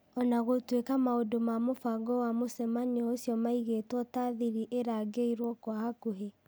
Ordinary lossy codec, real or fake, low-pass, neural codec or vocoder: none; real; none; none